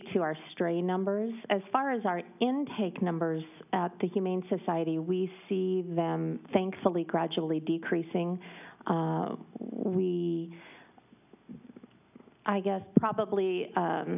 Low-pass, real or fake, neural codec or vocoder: 3.6 kHz; real; none